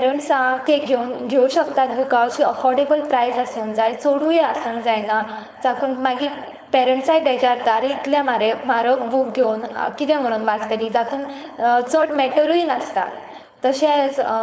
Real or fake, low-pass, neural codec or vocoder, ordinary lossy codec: fake; none; codec, 16 kHz, 4.8 kbps, FACodec; none